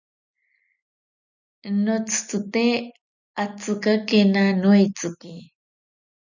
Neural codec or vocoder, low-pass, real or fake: none; 7.2 kHz; real